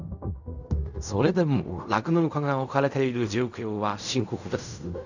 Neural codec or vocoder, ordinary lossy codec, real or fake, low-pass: codec, 16 kHz in and 24 kHz out, 0.4 kbps, LongCat-Audio-Codec, fine tuned four codebook decoder; none; fake; 7.2 kHz